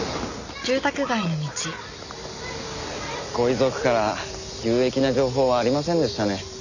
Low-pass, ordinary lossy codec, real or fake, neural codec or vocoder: 7.2 kHz; none; real; none